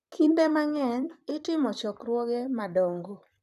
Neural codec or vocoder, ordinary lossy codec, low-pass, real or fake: vocoder, 44.1 kHz, 128 mel bands, Pupu-Vocoder; none; 14.4 kHz; fake